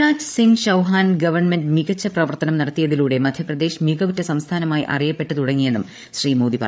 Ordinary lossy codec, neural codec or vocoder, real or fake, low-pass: none; codec, 16 kHz, 8 kbps, FreqCodec, larger model; fake; none